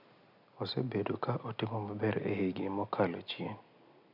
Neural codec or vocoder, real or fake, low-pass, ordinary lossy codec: none; real; 5.4 kHz; none